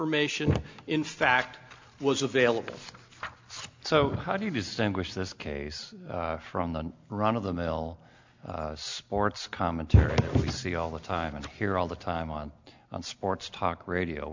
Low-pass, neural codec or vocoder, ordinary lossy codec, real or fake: 7.2 kHz; none; MP3, 64 kbps; real